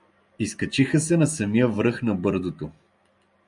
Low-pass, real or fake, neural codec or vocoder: 10.8 kHz; real; none